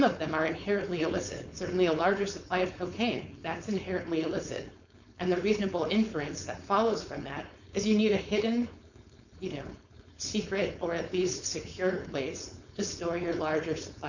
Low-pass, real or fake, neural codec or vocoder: 7.2 kHz; fake; codec, 16 kHz, 4.8 kbps, FACodec